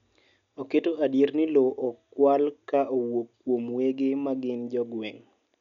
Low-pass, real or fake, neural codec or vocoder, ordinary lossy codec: 7.2 kHz; real; none; none